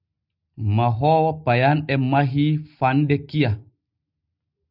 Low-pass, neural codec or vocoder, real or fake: 5.4 kHz; none; real